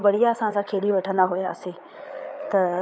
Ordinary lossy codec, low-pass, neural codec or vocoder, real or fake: none; none; codec, 16 kHz, 8 kbps, FreqCodec, larger model; fake